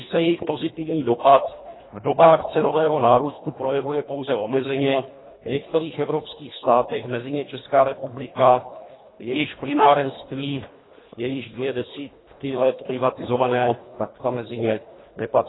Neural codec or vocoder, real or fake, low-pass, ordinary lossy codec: codec, 24 kHz, 1.5 kbps, HILCodec; fake; 7.2 kHz; AAC, 16 kbps